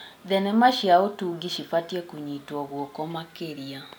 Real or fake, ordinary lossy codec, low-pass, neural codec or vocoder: real; none; none; none